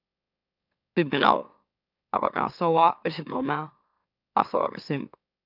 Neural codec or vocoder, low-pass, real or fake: autoencoder, 44.1 kHz, a latent of 192 numbers a frame, MeloTTS; 5.4 kHz; fake